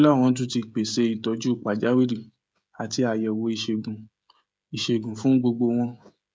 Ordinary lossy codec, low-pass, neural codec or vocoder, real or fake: none; none; codec, 16 kHz, 16 kbps, FreqCodec, smaller model; fake